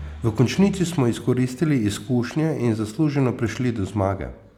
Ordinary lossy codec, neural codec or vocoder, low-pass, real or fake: none; none; 19.8 kHz; real